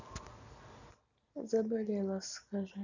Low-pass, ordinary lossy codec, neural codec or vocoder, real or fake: 7.2 kHz; none; none; real